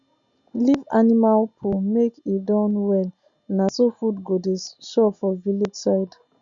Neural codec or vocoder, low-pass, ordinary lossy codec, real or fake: none; 7.2 kHz; Opus, 64 kbps; real